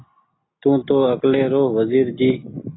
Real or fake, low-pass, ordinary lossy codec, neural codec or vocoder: real; 7.2 kHz; AAC, 16 kbps; none